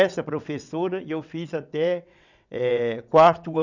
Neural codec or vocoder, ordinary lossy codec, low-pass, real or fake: vocoder, 22.05 kHz, 80 mel bands, WaveNeXt; none; 7.2 kHz; fake